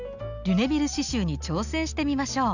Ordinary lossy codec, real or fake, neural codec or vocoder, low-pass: none; real; none; 7.2 kHz